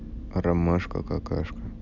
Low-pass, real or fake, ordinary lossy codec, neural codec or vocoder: 7.2 kHz; real; none; none